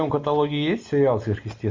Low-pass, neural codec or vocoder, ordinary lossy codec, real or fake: 7.2 kHz; none; MP3, 64 kbps; real